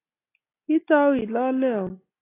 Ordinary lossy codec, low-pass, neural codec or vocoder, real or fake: AAC, 24 kbps; 3.6 kHz; none; real